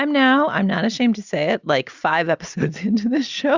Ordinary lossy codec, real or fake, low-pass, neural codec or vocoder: Opus, 64 kbps; real; 7.2 kHz; none